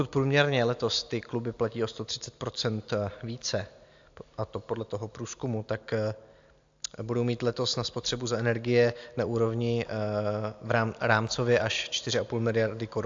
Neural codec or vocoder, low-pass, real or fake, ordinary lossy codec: none; 7.2 kHz; real; AAC, 64 kbps